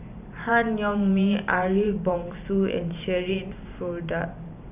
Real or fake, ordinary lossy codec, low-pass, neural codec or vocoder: fake; none; 3.6 kHz; vocoder, 44.1 kHz, 128 mel bands every 512 samples, BigVGAN v2